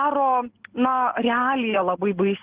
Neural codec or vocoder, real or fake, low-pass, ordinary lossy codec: none; real; 3.6 kHz; Opus, 16 kbps